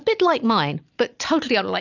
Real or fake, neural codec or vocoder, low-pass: real; none; 7.2 kHz